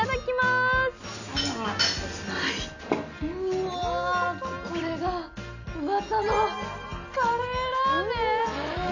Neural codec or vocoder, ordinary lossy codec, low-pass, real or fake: none; none; 7.2 kHz; real